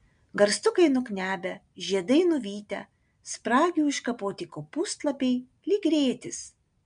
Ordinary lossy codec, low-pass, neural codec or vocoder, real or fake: MP3, 64 kbps; 9.9 kHz; none; real